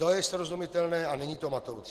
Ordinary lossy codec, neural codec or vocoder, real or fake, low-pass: Opus, 16 kbps; none; real; 14.4 kHz